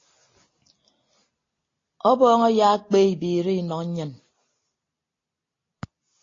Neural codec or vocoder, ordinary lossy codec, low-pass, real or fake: none; AAC, 32 kbps; 7.2 kHz; real